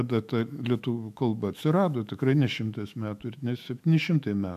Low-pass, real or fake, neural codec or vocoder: 14.4 kHz; fake; autoencoder, 48 kHz, 128 numbers a frame, DAC-VAE, trained on Japanese speech